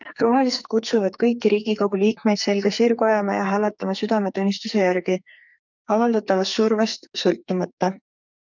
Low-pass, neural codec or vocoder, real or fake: 7.2 kHz; codec, 44.1 kHz, 2.6 kbps, SNAC; fake